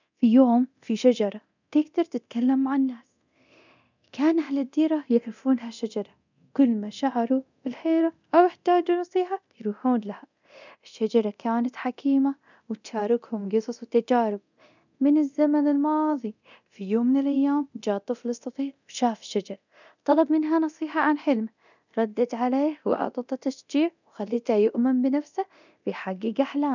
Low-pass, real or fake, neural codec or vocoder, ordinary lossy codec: 7.2 kHz; fake; codec, 24 kHz, 0.9 kbps, DualCodec; none